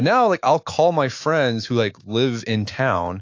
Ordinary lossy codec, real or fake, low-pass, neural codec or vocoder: AAC, 48 kbps; fake; 7.2 kHz; autoencoder, 48 kHz, 128 numbers a frame, DAC-VAE, trained on Japanese speech